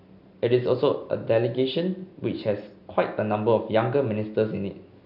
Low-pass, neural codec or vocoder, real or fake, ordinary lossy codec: 5.4 kHz; none; real; none